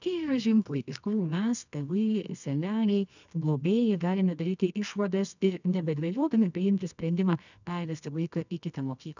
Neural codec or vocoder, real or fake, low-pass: codec, 24 kHz, 0.9 kbps, WavTokenizer, medium music audio release; fake; 7.2 kHz